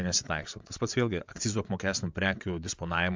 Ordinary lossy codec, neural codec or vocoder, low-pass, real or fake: MP3, 64 kbps; vocoder, 22.05 kHz, 80 mel bands, WaveNeXt; 7.2 kHz; fake